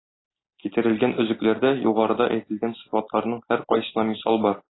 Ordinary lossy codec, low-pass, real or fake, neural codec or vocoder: AAC, 16 kbps; 7.2 kHz; real; none